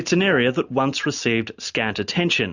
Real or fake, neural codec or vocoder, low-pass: real; none; 7.2 kHz